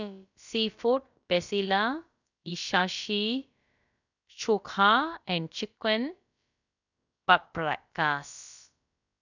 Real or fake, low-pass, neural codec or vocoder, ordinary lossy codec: fake; 7.2 kHz; codec, 16 kHz, about 1 kbps, DyCAST, with the encoder's durations; none